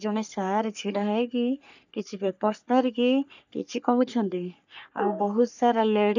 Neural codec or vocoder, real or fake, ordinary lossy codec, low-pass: codec, 44.1 kHz, 3.4 kbps, Pupu-Codec; fake; none; 7.2 kHz